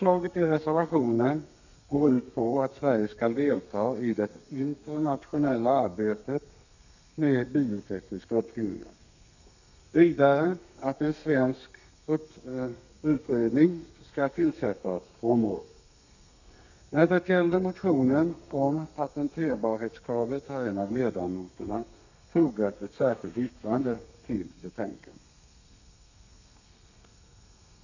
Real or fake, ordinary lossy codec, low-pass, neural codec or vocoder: fake; none; 7.2 kHz; codec, 32 kHz, 1.9 kbps, SNAC